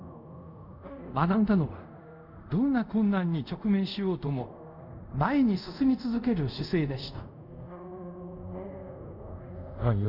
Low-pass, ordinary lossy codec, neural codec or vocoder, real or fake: 5.4 kHz; none; codec, 24 kHz, 0.5 kbps, DualCodec; fake